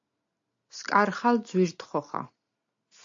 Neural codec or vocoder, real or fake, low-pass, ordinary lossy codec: none; real; 7.2 kHz; AAC, 48 kbps